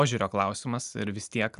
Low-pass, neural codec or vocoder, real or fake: 10.8 kHz; none; real